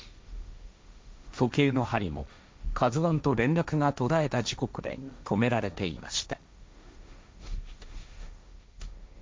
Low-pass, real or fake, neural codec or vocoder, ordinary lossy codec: none; fake; codec, 16 kHz, 1.1 kbps, Voila-Tokenizer; none